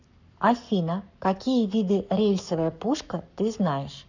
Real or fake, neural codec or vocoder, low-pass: fake; codec, 44.1 kHz, 7.8 kbps, Pupu-Codec; 7.2 kHz